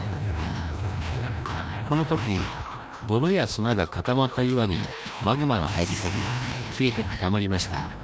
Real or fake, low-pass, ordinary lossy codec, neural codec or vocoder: fake; none; none; codec, 16 kHz, 1 kbps, FreqCodec, larger model